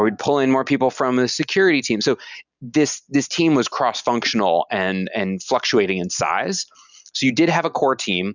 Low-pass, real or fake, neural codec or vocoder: 7.2 kHz; real; none